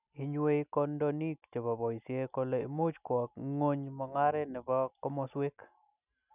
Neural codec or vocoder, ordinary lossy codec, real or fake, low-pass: none; none; real; 3.6 kHz